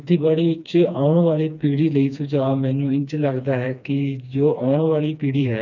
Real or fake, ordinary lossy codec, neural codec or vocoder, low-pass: fake; none; codec, 16 kHz, 2 kbps, FreqCodec, smaller model; 7.2 kHz